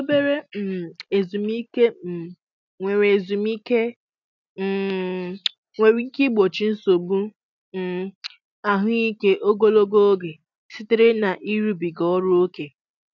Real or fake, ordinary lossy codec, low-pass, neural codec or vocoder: real; none; 7.2 kHz; none